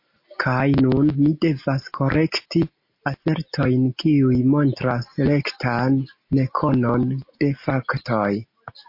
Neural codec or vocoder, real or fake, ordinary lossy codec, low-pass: none; real; MP3, 32 kbps; 5.4 kHz